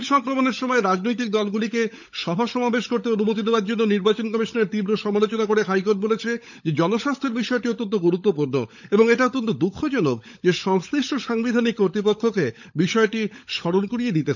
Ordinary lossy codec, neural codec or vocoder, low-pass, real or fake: none; codec, 16 kHz, 16 kbps, FunCodec, trained on LibriTTS, 50 frames a second; 7.2 kHz; fake